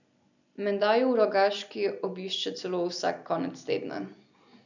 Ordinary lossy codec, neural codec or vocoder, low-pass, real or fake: none; none; 7.2 kHz; real